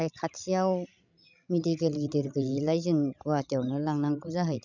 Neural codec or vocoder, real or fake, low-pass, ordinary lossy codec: codec, 16 kHz, 16 kbps, FreqCodec, larger model; fake; 7.2 kHz; none